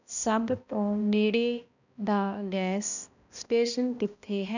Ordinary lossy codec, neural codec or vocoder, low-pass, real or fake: none; codec, 16 kHz, 1 kbps, X-Codec, HuBERT features, trained on balanced general audio; 7.2 kHz; fake